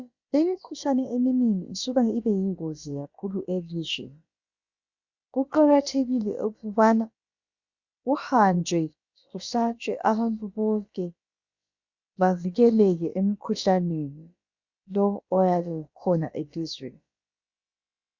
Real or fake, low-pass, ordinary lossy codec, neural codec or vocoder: fake; 7.2 kHz; Opus, 64 kbps; codec, 16 kHz, about 1 kbps, DyCAST, with the encoder's durations